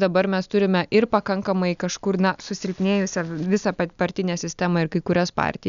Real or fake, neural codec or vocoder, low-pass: real; none; 7.2 kHz